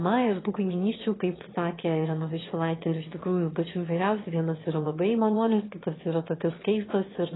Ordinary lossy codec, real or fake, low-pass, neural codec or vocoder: AAC, 16 kbps; fake; 7.2 kHz; autoencoder, 22.05 kHz, a latent of 192 numbers a frame, VITS, trained on one speaker